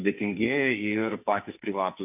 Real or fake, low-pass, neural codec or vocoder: fake; 3.6 kHz; codec, 44.1 kHz, 2.6 kbps, SNAC